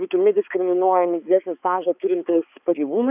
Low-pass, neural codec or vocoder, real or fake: 3.6 kHz; codec, 16 kHz, 4 kbps, X-Codec, HuBERT features, trained on balanced general audio; fake